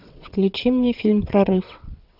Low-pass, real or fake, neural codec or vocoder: 5.4 kHz; fake; vocoder, 22.05 kHz, 80 mel bands, WaveNeXt